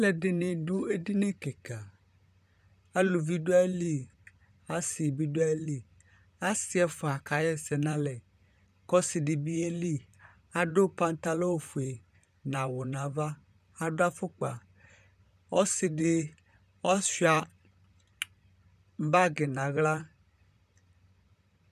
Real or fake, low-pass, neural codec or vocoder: fake; 14.4 kHz; vocoder, 44.1 kHz, 128 mel bands, Pupu-Vocoder